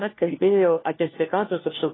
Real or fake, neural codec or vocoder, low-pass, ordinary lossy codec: fake; codec, 16 kHz, 1 kbps, FunCodec, trained on LibriTTS, 50 frames a second; 7.2 kHz; AAC, 16 kbps